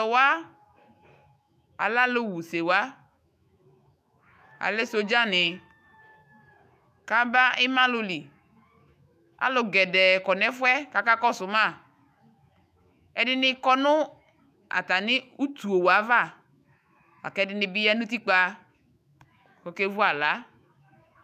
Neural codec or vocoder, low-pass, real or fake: autoencoder, 48 kHz, 128 numbers a frame, DAC-VAE, trained on Japanese speech; 14.4 kHz; fake